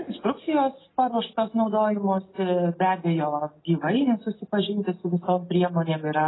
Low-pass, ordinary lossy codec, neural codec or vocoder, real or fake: 7.2 kHz; AAC, 16 kbps; none; real